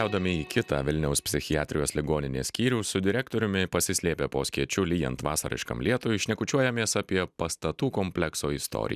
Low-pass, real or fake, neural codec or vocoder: 14.4 kHz; real; none